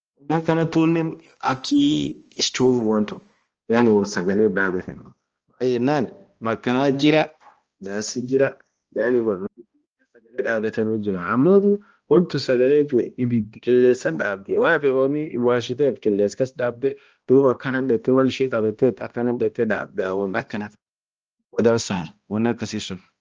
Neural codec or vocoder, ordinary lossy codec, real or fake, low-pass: codec, 16 kHz, 1 kbps, X-Codec, HuBERT features, trained on balanced general audio; Opus, 24 kbps; fake; 7.2 kHz